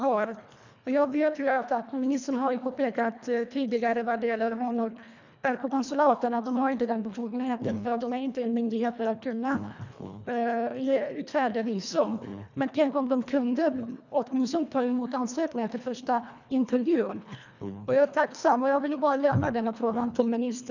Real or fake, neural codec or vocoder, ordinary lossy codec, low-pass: fake; codec, 24 kHz, 1.5 kbps, HILCodec; none; 7.2 kHz